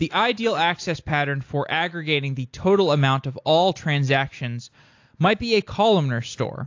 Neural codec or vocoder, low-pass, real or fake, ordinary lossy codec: none; 7.2 kHz; real; AAC, 48 kbps